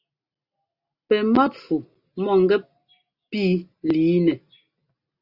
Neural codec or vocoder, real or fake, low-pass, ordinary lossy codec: none; real; 5.4 kHz; Opus, 64 kbps